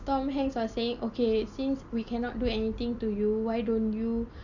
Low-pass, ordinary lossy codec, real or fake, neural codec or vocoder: 7.2 kHz; none; real; none